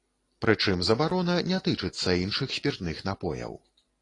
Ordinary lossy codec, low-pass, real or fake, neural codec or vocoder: AAC, 32 kbps; 10.8 kHz; real; none